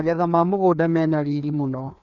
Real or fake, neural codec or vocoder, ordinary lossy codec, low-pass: fake; codec, 16 kHz, 2 kbps, FreqCodec, larger model; none; 7.2 kHz